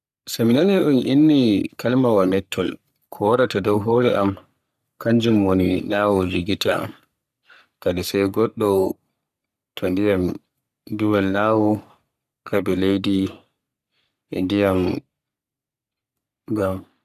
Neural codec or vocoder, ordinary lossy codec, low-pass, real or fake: codec, 44.1 kHz, 3.4 kbps, Pupu-Codec; none; 14.4 kHz; fake